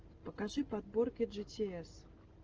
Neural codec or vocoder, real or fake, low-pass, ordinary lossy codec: none; real; 7.2 kHz; Opus, 16 kbps